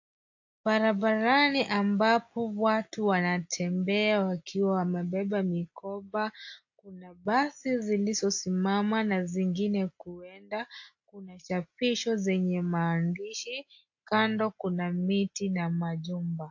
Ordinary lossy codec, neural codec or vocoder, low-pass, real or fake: AAC, 48 kbps; none; 7.2 kHz; real